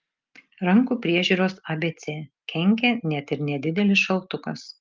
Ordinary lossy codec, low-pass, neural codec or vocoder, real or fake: Opus, 24 kbps; 7.2 kHz; none; real